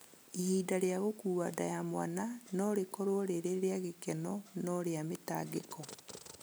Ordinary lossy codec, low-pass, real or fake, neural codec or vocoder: none; none; real; none